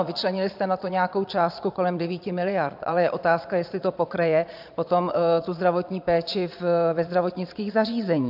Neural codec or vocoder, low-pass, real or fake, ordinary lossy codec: vocoder, 22.05 kHz, 80 mel bands, Vocos; 5.4 kHz; fake; AAC, 48 kbps